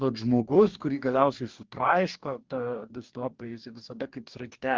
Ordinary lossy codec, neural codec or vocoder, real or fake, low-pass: Opus, 32 kbps; codec, 44.1 kHz, 2.6 kbps, DAC; fake; 7.2 kHz